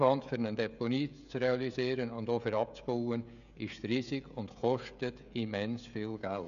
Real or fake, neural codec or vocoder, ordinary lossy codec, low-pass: fake; codec, 16 kHz, 16 kbps, FreqCodec, smaller model; none; 7.2 kHz